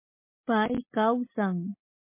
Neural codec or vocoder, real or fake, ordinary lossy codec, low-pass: none; real; MP3, 24 kbps; 3.6 kHz